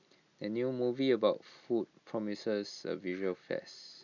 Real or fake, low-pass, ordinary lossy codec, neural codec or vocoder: real; 7.2 kHz; none; none